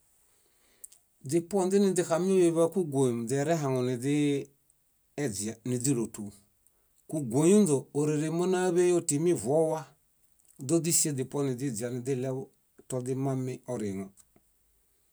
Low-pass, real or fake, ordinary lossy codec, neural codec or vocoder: none; real; none; none